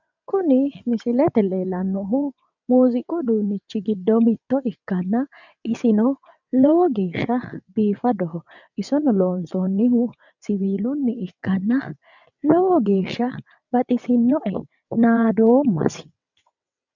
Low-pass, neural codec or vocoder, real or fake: 7.2 kHz; vocoder, 22.05 kHz, 80 mel bands, WaveNeXt; fake